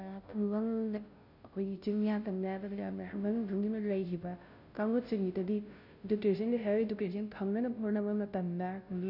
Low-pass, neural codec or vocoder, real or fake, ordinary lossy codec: 5.4 kHz; codec, 16 kHz, 0.5 kbps, FunCodec, trained on Chinese and English, 25 frames a second; fake; none